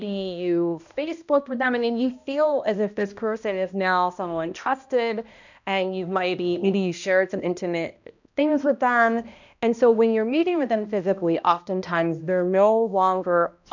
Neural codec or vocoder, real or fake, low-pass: codec, 16 kHz, 1 kbps, X-Codec, HuBERT features, trained on balanced general audio; fake; 7.2 kHz